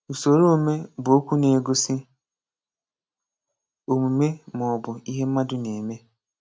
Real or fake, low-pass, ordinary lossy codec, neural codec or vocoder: real; none; none; none